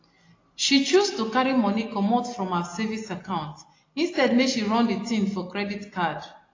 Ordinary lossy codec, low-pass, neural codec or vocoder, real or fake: AAC, 32 kbps; 7.2 kHz; none; real